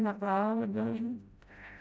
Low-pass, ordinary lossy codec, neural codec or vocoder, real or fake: none; none; codec, 16 kHz, 0.5 kbps, FreqCodec, smaller model; fake